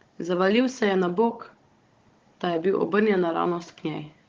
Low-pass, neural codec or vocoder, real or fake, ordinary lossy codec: 7.2 kHz; codec, 16 kHz, 16 kbps, FunCodec, trained on Chinese and English, 50 frames a second; fake; Opus, 16 kbps